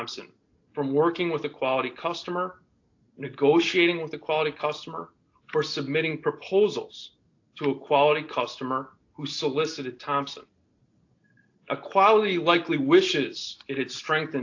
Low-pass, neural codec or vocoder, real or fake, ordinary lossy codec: 7.2 kHz; none; real; AAC, 48 kbps